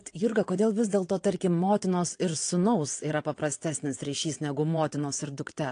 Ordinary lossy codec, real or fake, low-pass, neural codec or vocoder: AAC, 48 kbps; fake; 9.9 kHz; vocoder, 22.05 kHz, 80 mel bands, WaveNeXt